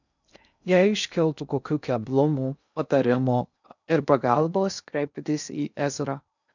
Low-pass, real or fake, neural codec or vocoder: 7.2 kHz; fake; codec, 16 kHz in and 24 kHz out, 0.6 kbps, FocalCodec, streaming, 2048 codes